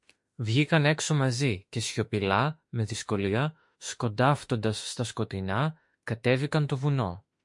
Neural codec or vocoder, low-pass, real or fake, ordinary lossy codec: autoencoder, 48 kHz, 32 numbers a frame, DAC-VAE, trained on Japanese speech; 10.8 kHz; fake; MP3, 48 kbps